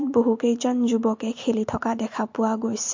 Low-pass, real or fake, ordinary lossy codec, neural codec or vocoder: 7.2 kHz; real; MP3, 48 kbps; none